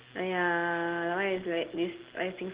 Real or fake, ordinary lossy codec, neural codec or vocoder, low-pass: real; Opus, 16 kbps; none; 3.6 kHz